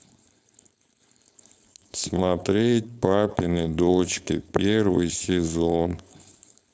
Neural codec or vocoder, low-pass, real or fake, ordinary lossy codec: codec, 16 kHz, 4.8 kbps, FACodec; none; fake; none